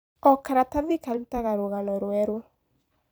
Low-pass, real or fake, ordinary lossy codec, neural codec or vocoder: none; fake; none; vocoder, 44.1 kHz, 128 mel bands every 256 samples, BigVGAN v2